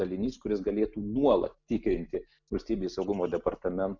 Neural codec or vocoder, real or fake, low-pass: none; real; 7.2 kHz